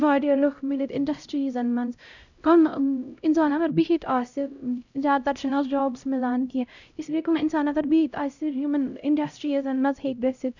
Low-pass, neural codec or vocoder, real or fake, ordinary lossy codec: 7.2 kHz; codec, 16 kHz, 0.5 kbps, X-Codec, HuBERT features, trained on LibriSpeech; fake; none